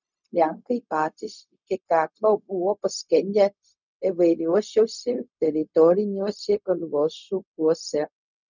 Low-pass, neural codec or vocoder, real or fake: 7.2 kHz; codec, 16 kHz, 0.4 kbps, LongCat-Audio-Codec; fake